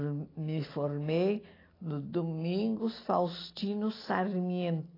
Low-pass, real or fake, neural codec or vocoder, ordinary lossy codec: 5.4 kHz; real; none; AAC, 24 kbps